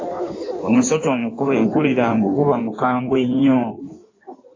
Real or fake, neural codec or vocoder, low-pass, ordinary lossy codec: fake; codec, 16 kHz in and 24 kHz out, 1.1 kbps, FireRedTTS-2 codec; 7.2 kHz; AAC, 32 kbps